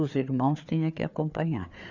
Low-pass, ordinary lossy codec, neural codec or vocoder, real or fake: 7.2 kHz; none; codec, 16 kHz, 4 kbps, FreqCodec, larger model; fake